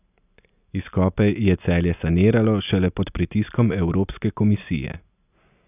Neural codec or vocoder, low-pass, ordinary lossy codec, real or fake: none; 3.6 kHz; none; real